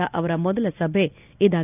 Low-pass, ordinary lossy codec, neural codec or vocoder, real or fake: 3.6 kHz; none; none; real